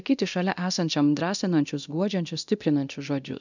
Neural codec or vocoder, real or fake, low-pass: codec, 24 kHz, 0.9 kbps, DualCodec; fake; 7.2 kHz